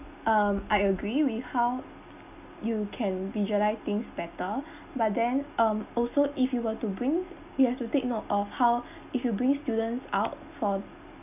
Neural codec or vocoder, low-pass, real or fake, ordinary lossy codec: none; 3.6 kHz; real; none